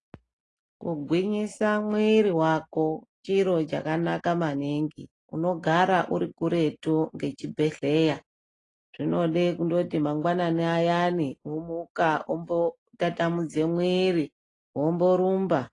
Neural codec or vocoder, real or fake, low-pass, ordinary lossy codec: none; real; 10.8 kHz; AAC, 32 kbps